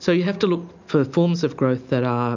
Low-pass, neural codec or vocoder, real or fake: 7.2 kHz; none; real